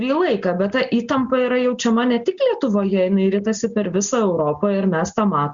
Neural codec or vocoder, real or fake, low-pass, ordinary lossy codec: none; real; 7.2 kHz; Opus, 64 kbps